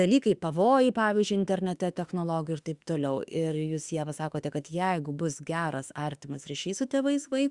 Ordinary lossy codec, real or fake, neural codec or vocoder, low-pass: Opus, 64 kbps; fake; autoencoder, 48 kHz, 32 numbers a frame, DAC-VAE, trained on Japanese speech; 10.8 kHz